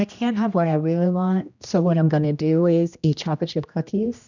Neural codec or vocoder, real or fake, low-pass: codec, 16 kHz, 1 kbps, X-Codec, HuBERT features, trained on general audio; fake; 7.2 kHz